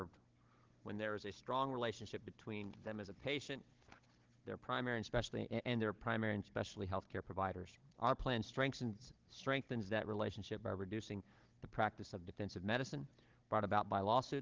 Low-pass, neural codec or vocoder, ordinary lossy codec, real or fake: 7.2 kHz; codec, 16 kHz, 16 kbps, FunCodec, trained on Chinese and English, 50 frames a second; Opus, 16 kbps; fake